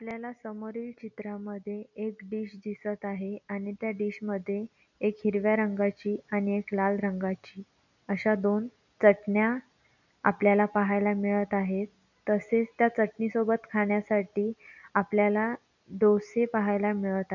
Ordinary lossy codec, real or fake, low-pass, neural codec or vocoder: none; real; 7.2 kHz; none